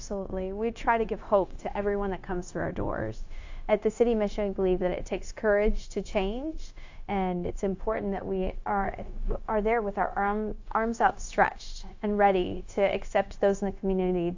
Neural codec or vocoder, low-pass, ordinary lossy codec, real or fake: codec, 16 kHz, 0.9 kbps, LongCat-Audio-Codec; 7.2 kHz; AAC, 48 kbps; fake